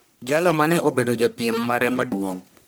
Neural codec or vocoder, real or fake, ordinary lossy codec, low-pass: codec, 44.1 kHz, 1.7 kbps, Pupu-Codec; fake; none; none